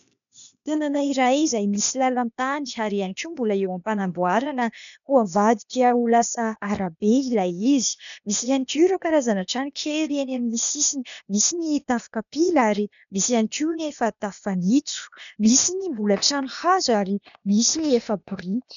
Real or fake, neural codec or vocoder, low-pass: fake; codec, 16 kHz, 0.8 kbps, ZipCodec; 7.2 kHz